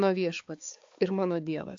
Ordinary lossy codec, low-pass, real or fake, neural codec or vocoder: AAC, 48 kbps; 7.2 kHz; fake; codec, 16 kHz, 4 kbps, X-Codec, HuBERT features, trained on balanced general audio